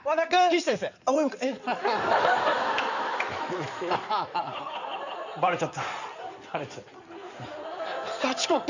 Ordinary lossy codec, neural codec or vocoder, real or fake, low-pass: none; codec, 16 kHz in and 24 kHz out, 2.2 kbps, FireRedTTS-2 codec; fake; 7.2 kHz